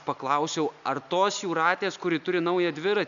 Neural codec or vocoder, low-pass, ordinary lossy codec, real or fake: none; 7.2 kHz; MP3, 96 kbps; real